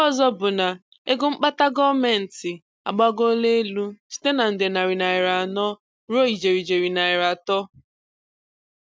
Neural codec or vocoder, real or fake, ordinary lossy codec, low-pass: none; real; none; none